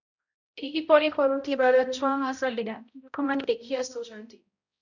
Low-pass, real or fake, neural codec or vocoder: 7.2 kHz; fake; codec, 16 kHz, 0.5 kbps, X-Codec, HuBERT features, trained on balanced general audio